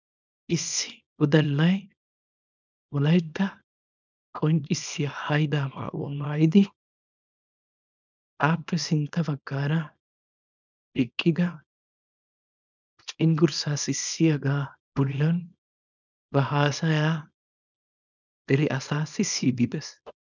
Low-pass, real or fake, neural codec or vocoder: 7.2 kHz; fake; codec, 24 kHz, 0.9 kbps, WavTokenizer, small release